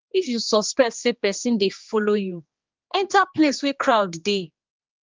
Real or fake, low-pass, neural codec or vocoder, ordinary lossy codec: fake; 7.2 kHz; codec, 16 kHz, 2 kbps, X-Codec, HuBERT features, trained on general audio; Opus, 32 kbps